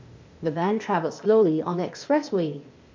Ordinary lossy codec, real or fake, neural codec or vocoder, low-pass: MP3, 48 kbps; fake; codec, 16 kHz, 0.8 kbps, ZipCodec; 7.2 kHz